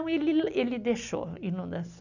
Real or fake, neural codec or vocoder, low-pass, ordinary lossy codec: real; none; 7.2 kHz; none